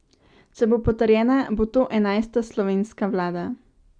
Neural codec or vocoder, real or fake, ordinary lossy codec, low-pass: none; real; Opus, 64 kbps; 9.9 kHz